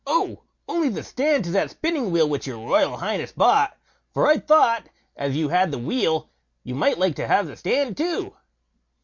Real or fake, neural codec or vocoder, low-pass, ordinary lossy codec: real; none; 7.2 kHz; MP3, 48 kbps